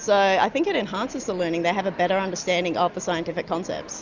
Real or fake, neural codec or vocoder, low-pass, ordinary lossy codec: real; none; 7.2 kHz; Opus, 64 kbps